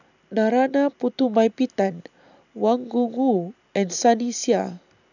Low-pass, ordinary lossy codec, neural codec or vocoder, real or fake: 7.2 kHz; none; none; real